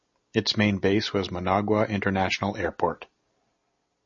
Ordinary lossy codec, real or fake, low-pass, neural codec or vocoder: MP3, 32 kbps; real; 7.2 kHz; none